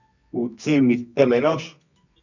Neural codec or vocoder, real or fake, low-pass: codec, 24 kHz, 0.9 kbps, WavTokenizer, medium music audio release; fake; 7.2 kHz